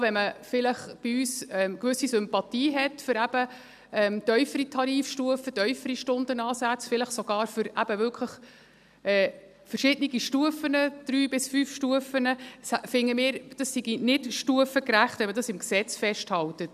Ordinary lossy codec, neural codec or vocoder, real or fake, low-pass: none; none; real; 14.4 kHz